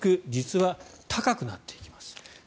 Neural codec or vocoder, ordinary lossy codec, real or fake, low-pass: none; none; real; none